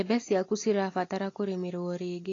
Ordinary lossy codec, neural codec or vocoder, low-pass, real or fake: AAC, 32 kbps; none; 7.2 kHz; real